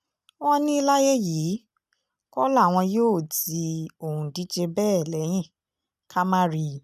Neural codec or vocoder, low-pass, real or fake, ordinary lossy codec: none; 14.4 kHz; real; none